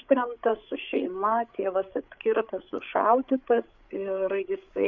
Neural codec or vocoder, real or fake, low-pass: codec, 16 kHz, 8 kbps, FreqCodec, larger model; fake; 7.2 kHz